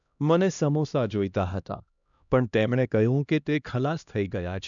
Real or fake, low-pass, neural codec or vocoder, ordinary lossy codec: fake; 7.2 kHz; codec, 16 kHz, 1 kbps, X-Codec, HuBERT features, trained on LibriSpeech; none